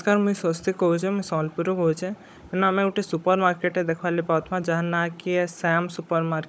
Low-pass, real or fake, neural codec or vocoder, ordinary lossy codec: none; fake; codec, 16 kHz, 16 kbps, FunCodec, trained on Chinese and English, 50 frames a second; none